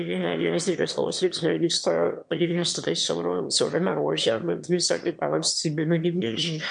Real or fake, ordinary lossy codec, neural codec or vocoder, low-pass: fake; MP3, 64 kbps; autoencoder, 22.05 kHz, a latent of 192 numbers a frame, VITS, trained on one speaker; 9.9 kHz